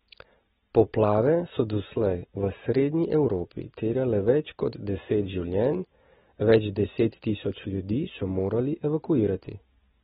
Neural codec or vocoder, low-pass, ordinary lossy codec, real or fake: none; 7.2 kHz; AAC, 16 kbps; real